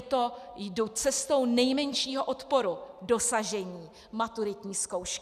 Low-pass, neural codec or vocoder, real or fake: 14.4 kHz; none; real